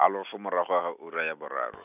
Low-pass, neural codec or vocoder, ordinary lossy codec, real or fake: 3.6 kHz; none; none; real